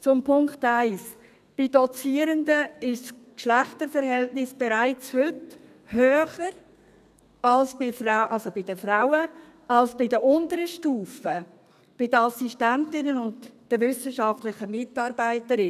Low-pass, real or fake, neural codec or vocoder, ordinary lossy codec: 14.4 kHz; fake; codec, 32 kHz, 1.9 kbps, SNAC; none